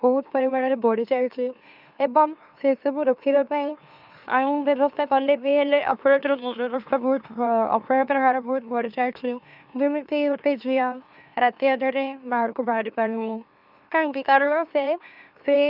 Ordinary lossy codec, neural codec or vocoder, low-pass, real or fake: none; autoencoder, 44.1 kHz, a latent of 192 numbers a frame, MeloTTS; 5.4 kHz; fake